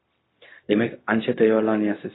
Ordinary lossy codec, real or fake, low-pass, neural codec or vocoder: AAC, 16 kbps; fake; 7.2 kHz; codec, 16 kHz, 0.4 kbps, LongCat-Audio-Codec